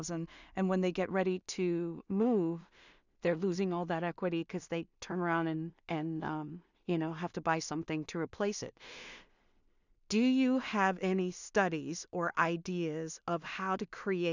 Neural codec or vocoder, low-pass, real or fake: codec, 16 kHz in and 24 kHz out, 0.4 kbps, LongCat-Audio-Codec, two codebook decoder; 7.2 kHz; fake